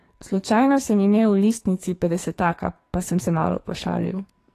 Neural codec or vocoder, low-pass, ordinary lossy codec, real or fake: codec, 44.1 kHz, 2.6 kbps, SNAC; 14.4 kHz; AAC, 48 kbps; fake